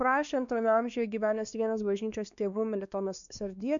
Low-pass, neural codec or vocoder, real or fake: 7.2 kHz; codec, 16 kHz, 2 kbps, X-Codec, WavLM features, trained on Multilingual LibriSpeech; fake